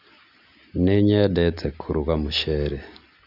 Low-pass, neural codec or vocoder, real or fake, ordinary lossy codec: 5.4 kHz; none; real; AAC, 48 kbps